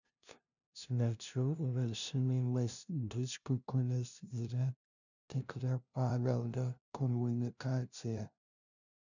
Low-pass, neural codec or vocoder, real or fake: 7.2 kHz; codec, 16 kHz, 0.5 kbps, FunCodec, trained on LibriTTS, 25 frames a second; fake